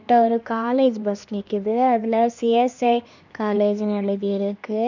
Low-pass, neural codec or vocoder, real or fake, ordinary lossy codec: 7.2 kHz; codec, 16 kHz, 2 kbps, X-Codec, HuBERT features, trained on LibriSpeech; fake; none